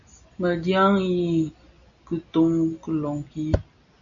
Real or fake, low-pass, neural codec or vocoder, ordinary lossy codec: real; 7.2 kHz; none; MP3, 96 kbps